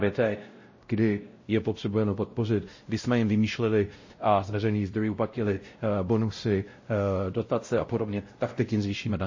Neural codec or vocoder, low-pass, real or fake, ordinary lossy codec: codec, 16 kHz, 0.5 kbps, X-Codec, WavLM features, trained on Multilingual LibriSpeech; 7.2 kHz; fake; MP3, 32 kbps